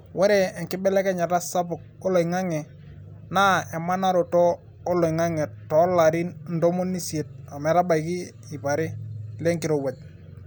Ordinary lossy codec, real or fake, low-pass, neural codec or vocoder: none; real; none; none